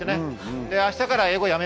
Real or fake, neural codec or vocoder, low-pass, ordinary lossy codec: real; none; none; none